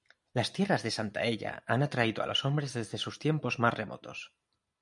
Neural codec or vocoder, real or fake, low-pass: none; real; 10.8 kHz